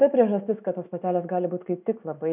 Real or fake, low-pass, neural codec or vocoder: real; 3.6 kHz; none